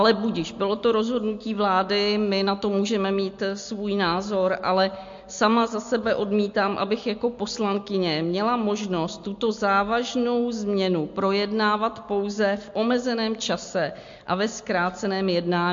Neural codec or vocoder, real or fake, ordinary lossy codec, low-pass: none; real; MP3, 48 kbps; 7.2 kHz